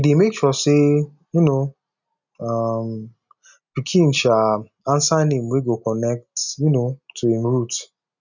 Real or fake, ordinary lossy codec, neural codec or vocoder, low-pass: real; none; none; 7.2 kHz